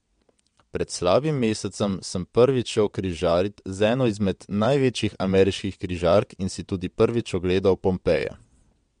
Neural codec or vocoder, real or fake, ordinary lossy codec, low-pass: vocoder, 22.05 kHz, 80 mel bands, WaveNeXt; fake; MP3, 64 kbps; 9.9 kHz